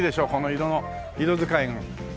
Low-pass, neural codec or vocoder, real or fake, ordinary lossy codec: none; none; real; none